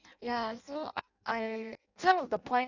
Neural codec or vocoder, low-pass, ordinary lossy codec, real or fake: codec, 16 kHz in and 24 kHz out, 0.6 kbps, FireRedTTS-2 codec; 7.2 kHz; none; fake